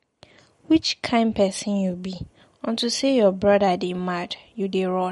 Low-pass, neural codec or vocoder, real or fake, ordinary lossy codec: 19.8 kHz; none; real; MP3, 48 kbps